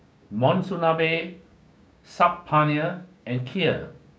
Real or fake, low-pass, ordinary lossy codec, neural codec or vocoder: fake; none; none; codec, 16 kHz, 6 kbps, DAC